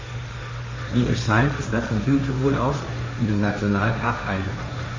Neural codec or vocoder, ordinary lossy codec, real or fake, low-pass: codec, 16 kHz, 1.1 kbps, Voila-Tokenizer; MP3, 64 kbps; fake; 7.2 kHz